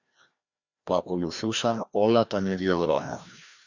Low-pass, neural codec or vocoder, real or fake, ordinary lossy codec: 7.2 kHz; codec, 16 kHz, 1 kbps, FreqCodec, larger model; fake; Opus, 64 kbps